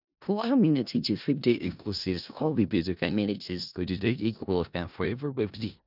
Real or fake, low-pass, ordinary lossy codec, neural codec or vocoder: fake; 5.4 kHz; none; codec, 16 kHz in and 24 kHz out, 0.4 kbps, LongCat-Audio-Codec, four codebook decoder